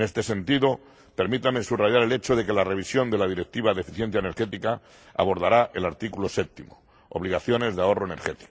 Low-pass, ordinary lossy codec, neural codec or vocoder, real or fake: none; none; none; real